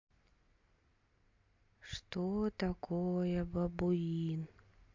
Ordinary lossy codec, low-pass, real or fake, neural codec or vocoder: MP3, 48 kbps; 7.2 kHz; real; none